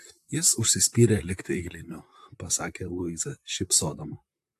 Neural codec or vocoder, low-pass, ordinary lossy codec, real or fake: vocoder, 44.1 kHz, 128 mel bands, Pupu-Vocoder; 14.4 kHz; AAC, 64 kbps; fake